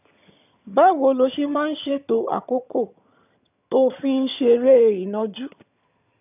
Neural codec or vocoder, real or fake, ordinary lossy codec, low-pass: vocoder, 22.05 kHz, 80 mel bands, HiFi-GAN; fake; none; 3.6 kHz